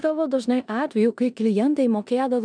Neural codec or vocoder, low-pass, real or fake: codec, 16 kHz in and 24 kHz out, 0.9 kbps, LongCat-Audio-Codec, four codebook decoder; 9.9 kHz; fake